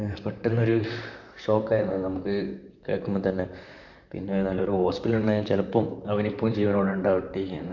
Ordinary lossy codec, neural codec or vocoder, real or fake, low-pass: none; vocoder, 44.1 kHz, 128 mel bands, Pupu-Vocoder; fake; 7.2 kHz